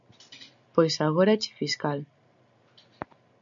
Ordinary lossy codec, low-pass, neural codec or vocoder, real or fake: MP3, 64 kbps; 7.2 kHz; none; real